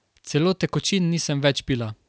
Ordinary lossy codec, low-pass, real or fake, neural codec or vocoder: none; none; real; none